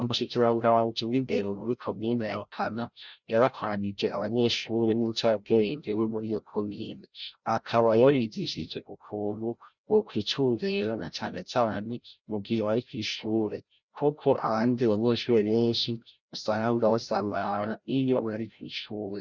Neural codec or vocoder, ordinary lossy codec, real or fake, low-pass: codec, 16 kHz, 0.5 kbps, FreqCodec, larger model; Opus, 64 kbps; fake; 7.2 kHz